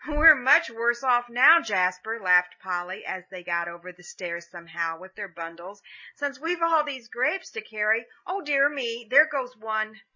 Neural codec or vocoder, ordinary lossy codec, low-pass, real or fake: none; MP3, 32 kbps; 7.2 kHz; real